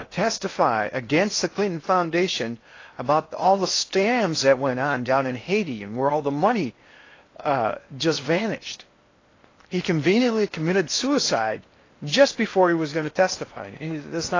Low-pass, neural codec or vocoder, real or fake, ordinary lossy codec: 7.2 kHz; codec, 16 kHz in and 24 kHz out, 0.8 kbps, FocalCodec, streaming, 65536 codes; fake; AAC, 32 kbps